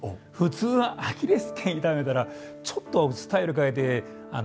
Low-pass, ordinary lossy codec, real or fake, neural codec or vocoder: none; none; real; none